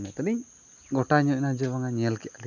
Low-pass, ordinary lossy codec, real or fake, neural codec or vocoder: 7.2 kHz; none; real; none